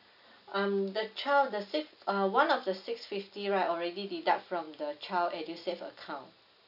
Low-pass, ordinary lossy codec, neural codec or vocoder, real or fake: 5.4 kHz; none; none; real